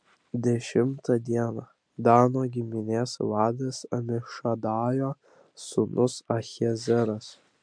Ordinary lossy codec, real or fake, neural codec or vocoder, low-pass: MP3, 64 kbps; real; none; 9.9 kHz